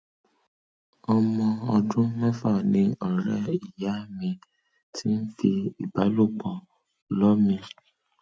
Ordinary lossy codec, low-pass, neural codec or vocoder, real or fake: none; none; none; real